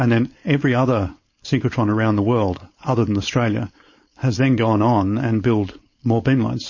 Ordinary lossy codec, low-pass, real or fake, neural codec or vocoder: MP3, 32 kbps; 7.2 kHz; fake; codec, 16 kHz, 4.8 kbps, FACodec